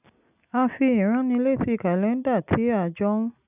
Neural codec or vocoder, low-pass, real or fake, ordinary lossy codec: none; 3.6 kHz; real; none